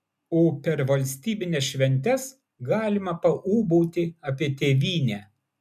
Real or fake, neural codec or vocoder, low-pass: real; none; 14.4 kHz